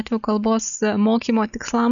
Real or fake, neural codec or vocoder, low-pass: real; none; 7.2 kHz